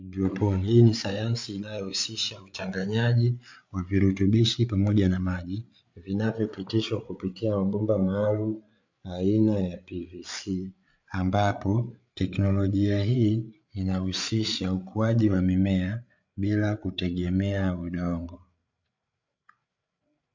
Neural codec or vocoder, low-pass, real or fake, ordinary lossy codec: codec, 16 kHz, 8 kbps, FreqCodec, larger model; 7.2 kHz; fake; MP3, 64 kbps